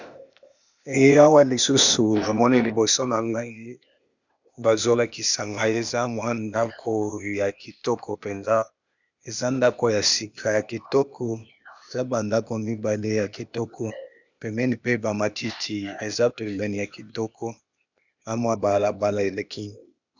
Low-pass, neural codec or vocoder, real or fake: 7.2 kHz; codec, 16 kHz, 0.8 kbps, ZipCodec; fake